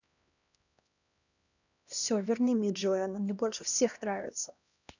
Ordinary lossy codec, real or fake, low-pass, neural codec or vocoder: none; fake; 7.2 kHz; codec, 16 kHz, 1 kbps, X-Codec, HuBERT features, trained on LibriSpeech